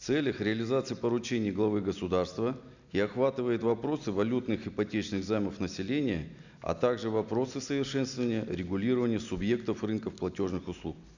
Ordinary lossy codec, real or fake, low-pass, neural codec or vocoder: none; real; 7.2 kHz; none